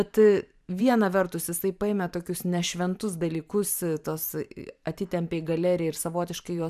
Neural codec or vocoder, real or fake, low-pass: vocoder, 44.1 kHz, 128 mel bands every 512 samples, BigVGAN v2; fake; 14.4 kHz